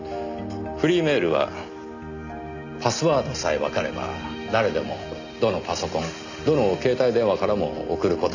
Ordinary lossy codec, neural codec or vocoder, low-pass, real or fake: none; none; 7.2 kHz; real